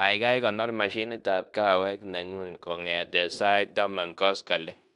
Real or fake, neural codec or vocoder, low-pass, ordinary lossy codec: fake; codec, 16 kHz in and 24 kHz out, 0.9 kbps, LongCat-Audio-Codec, fine tuned four codebook decoder; 10.8 kHz; none